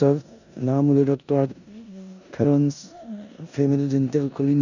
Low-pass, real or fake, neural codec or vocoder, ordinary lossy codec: 7.2 kHz; fake; codec, 16 kHz in and 24 kHz out, 0.9 kbps, LongCat-Audio-Codec, four codebook decoder; none